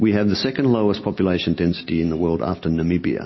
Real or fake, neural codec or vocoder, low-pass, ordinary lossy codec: real; none; 7.2 kHz; MP3, 24 kbps